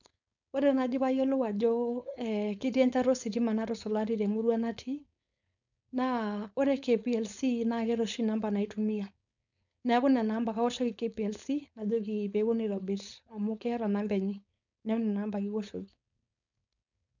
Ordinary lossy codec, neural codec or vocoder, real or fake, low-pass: none; codec, 16 kHz, 4.8 kbps, FACodec; fake; 7.2 kHz